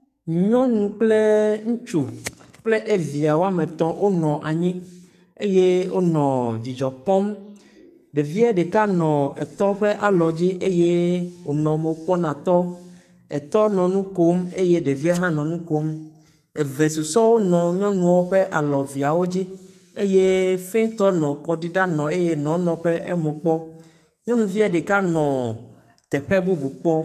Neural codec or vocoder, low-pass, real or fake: codec, 44.1 kHz, 2.6 kbps, SNAC; 14.4 kHz; fake